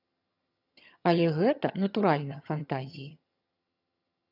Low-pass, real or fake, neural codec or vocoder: 5.4 kHz; fake; vocoder, 22.05 kHz, 80 mel bands, HiFi-GAN